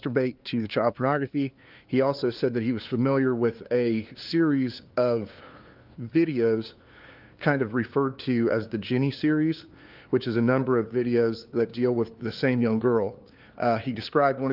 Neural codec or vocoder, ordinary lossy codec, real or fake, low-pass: codec, 16 kHz, 2 kbps, FunCodec, trained on LibriTTS, 25 frames a second; Opus, 32 kbps; fake; 5.4 kHz